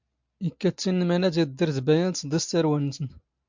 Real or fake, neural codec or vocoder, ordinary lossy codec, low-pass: real; none; MP3, 64 kbps; 7.2 kHz